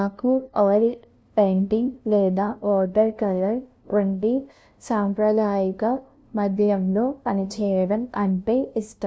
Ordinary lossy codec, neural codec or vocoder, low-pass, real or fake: none; codec, 16 kHz, 0.5 kbps, FunCodec, trained on LibriTTS, 25 frames a second; none; fake